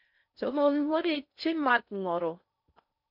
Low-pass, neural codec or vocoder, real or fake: 5.4 kHz; codec, 16 kHz in and 24 kHz out, 0.6 kbps, FocalCodec, streaming, 4096 codes; fake